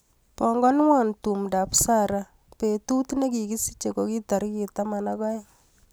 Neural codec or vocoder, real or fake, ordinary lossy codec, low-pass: none; real; none; none